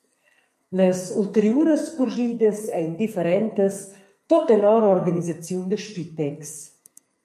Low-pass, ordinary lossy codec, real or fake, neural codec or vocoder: 14.4 kHz; MP3, 64 kbps; fake; codec, 44.1 kHz, 2.6 kbps, SNAC